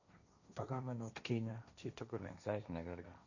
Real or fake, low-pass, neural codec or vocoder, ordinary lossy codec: fake; 7.2 kHz; codec, 16 kHz, 1.1 kbps, Voila-Tokenizer; none